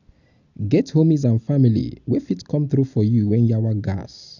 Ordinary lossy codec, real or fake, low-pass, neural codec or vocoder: none; real; 7.2 kHz; none